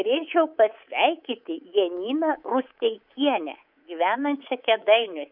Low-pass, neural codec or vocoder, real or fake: 5.4 kHz; codec, 24 kHz, 3.1 kbps, DualCodec; fake